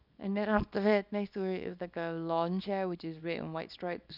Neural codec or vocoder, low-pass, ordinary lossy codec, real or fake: codec, 24 kHz, 0.9 kbps, WavTokenizer, small release; 5.4 kHz; none; fake